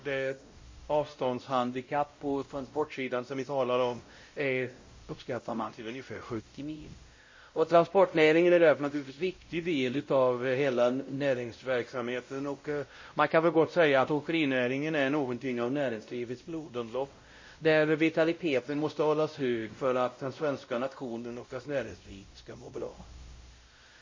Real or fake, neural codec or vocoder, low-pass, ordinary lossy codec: fake; codec, 16 kHz, 0.5 kbps, X-Codec, WavLM features, trained on Multilingual LibriSpeech; 7.2 kHz; MP3, 32 kbps